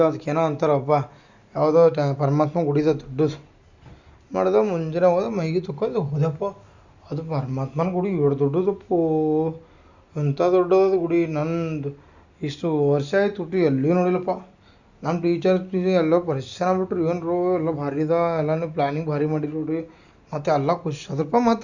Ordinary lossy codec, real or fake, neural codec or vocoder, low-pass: Opus, 64 kbps; real; none; 7.2 kHz